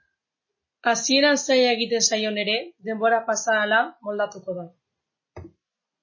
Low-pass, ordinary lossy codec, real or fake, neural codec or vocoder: 7.2 kHz; MP3, 32 kbps; real; none